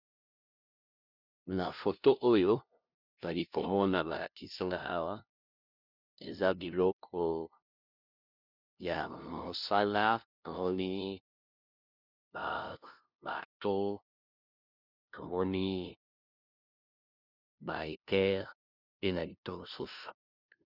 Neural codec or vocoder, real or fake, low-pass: codec, 16 kHz, 0.5 kbps, FunCodec, trained on LibriTTS, 25 frames a second; fake; 5.4 kHz